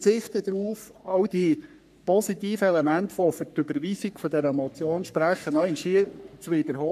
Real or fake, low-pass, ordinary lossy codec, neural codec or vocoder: fake; 14.4 kHz; none; codec, 44.1 kHz, 3.4 kbps, Pupu-Codec